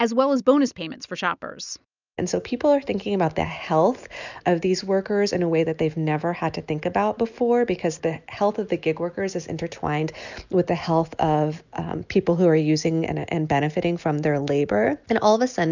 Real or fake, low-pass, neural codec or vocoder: real; 7.2 kHz; none